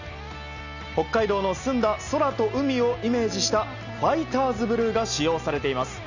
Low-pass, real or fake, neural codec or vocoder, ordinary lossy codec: 7.2 kHz; real; none; none